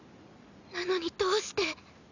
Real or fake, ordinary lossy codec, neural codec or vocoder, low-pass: real; none; none; 7.2 kHz